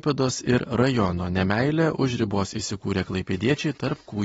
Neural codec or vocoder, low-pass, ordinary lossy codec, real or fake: none; 19.8 kHz; AAC, 24 kbps; real